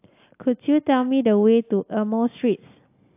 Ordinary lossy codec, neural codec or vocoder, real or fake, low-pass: AAC, 32 kbps; none; real; 3.6 kHz